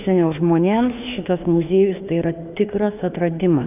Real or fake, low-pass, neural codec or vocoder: fake; 3.6 kHz; autoencoder, 48 kHz, 32 numbers a frame, DAC-VAE, trained on Japanese speech